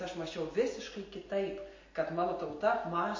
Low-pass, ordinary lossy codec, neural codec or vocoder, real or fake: 7.2 kHz; MP3, 32 kbps; none; real